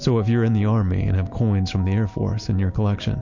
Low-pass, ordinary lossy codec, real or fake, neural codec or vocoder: 7.2 kHz; MP3, 48 kbps; real; none